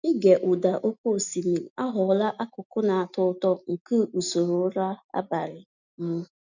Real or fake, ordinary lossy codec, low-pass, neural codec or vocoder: real; none; 7.2 kHz; none